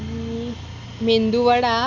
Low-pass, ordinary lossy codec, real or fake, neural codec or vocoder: 7.2 kHz; MP3, 64 kbps; real; none